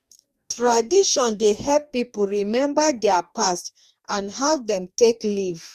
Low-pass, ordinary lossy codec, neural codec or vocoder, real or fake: 14.4 kHz; Opus, 64 kbps; codec, 44.1 kHz, 2.6 kbps, DAC; fake